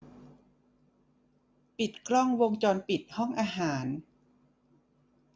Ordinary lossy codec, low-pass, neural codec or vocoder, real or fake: none; none; none; real